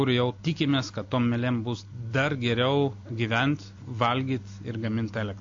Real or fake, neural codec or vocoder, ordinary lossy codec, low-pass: real; none; AAC, 48 kbps; 7.2 kHz